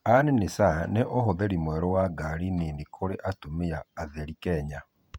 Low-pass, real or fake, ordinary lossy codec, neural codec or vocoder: 19.8 kHz; fake; none; vocoder, 48 kHz, 128 mel bands, Vocos